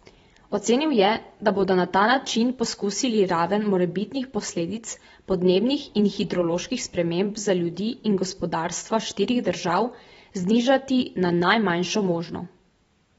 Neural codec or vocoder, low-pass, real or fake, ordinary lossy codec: vocoder, 44.1 kHz, 128 mel bands every 512 samples, BigVGAN v2; 19.8 kHz; fake; AAC, 24 kbps